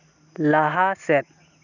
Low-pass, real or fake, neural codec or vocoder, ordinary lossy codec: 7.2 kHz; real; none; none